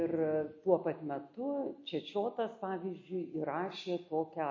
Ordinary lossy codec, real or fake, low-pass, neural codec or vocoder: MP3, 32 kbps; real; 7.2 kHz; none